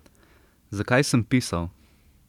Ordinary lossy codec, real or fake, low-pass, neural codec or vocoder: none; fake; 19.8 kHz; vocoder, 44.1 kHz, 128 mel bands every 512 samples, BigVGAN v2